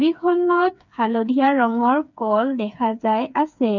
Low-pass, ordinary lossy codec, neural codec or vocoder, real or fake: 7.2 kHz; none; codec, 16 kHz, 4 kbps, FreqCodec, smaller model; fake